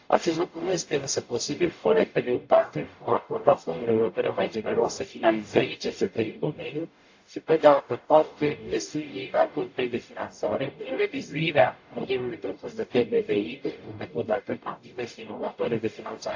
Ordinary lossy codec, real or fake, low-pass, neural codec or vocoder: AAC, 48 kbps; fake; 7.2 kHz; codec, 44.1 kHz, 0.9 kbps, DAC